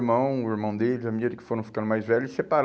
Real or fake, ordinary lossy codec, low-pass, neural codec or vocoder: real; none; none; none